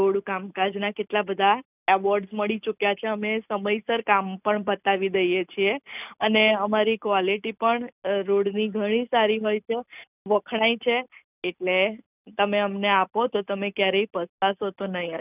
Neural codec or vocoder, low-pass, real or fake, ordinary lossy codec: none; 3.6 kHz; real; none